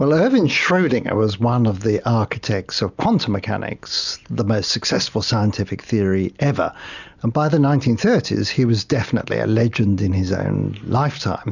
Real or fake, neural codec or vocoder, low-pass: real; none; 7.2 kHz